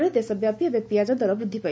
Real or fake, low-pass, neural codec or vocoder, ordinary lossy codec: real; none; none; none